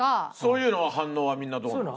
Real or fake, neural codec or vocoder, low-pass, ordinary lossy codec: real; none; none; none